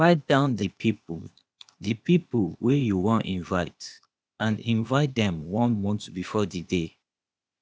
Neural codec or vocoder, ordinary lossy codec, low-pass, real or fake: codec, 16 kHz, 0.8 kbps, ZipCodec; none; none; fake